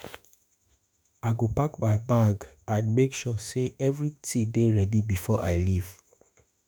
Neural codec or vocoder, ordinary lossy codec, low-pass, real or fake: autoencoder, 48 kHz, 32 numbers a frame, DAC-VAE, trained on Japanese speech; none; none; fake